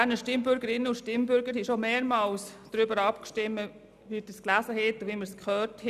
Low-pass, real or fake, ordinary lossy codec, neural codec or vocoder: 14.4 kHz; real; none; none